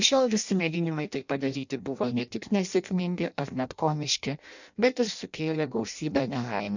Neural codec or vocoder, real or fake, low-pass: codec, 16 kHz in and 24 kHz out, 0.6 kbps, FireRedTTS-2 codec; fake; 7.2 kHz